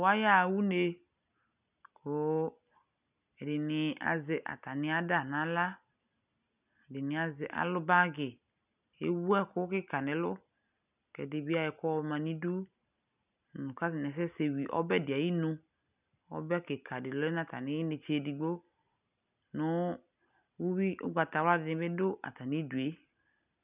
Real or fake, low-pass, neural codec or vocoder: real; 3.6 kHz; none